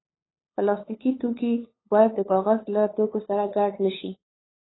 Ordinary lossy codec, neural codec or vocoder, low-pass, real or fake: AAC, 16 kbps; codec, 16 kHz, 8 kbps, FunCodec, trained on LibriTTS, 25 frames a second; 7.2 kHz; fake